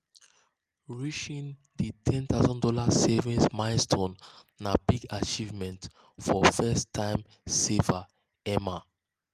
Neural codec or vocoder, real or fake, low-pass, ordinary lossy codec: none; real; 14.4 kHz; Opus, 32 kbps